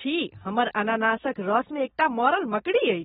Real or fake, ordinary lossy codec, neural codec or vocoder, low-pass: real; AAC, 16 kbps; none; 7.2 kHz